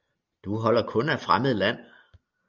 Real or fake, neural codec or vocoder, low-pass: real; none; 7.2 kHz